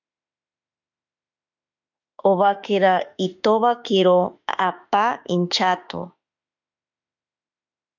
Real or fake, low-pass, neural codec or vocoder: fake; 7.2 kHz; autoencoder, 48 kHz, 32 numbers a frame, DAC-VAE, trained on Japanese speech